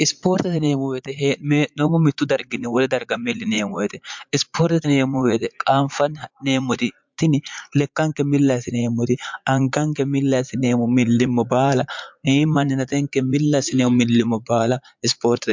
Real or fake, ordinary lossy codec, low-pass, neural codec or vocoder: fake; MP3, 64 kbps; 7.2 kHz; vocoder, 44.1 kHz, 80 mel bands, Vocos